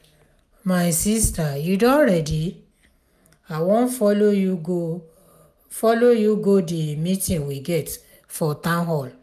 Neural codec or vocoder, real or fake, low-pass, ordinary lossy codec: none; real; 14.4 kHz; none